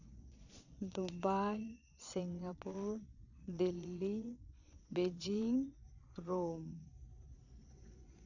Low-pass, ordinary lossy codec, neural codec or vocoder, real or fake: 7.2 kHz; none; vocoder, 22.05 kHz, 80 mel bands, Vocos; fake